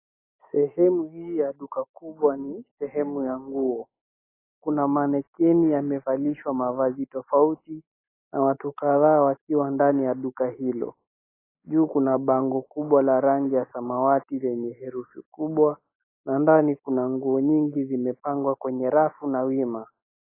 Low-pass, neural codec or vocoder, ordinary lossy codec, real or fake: 3.6 kHz; none; AAC, 24 kbps; real